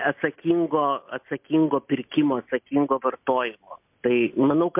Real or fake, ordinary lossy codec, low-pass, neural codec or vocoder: real; MP3, 32 kbps; 3.6 kHz; none